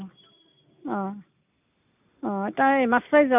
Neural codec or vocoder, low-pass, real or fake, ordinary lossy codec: none; 3.6 kHz; real; none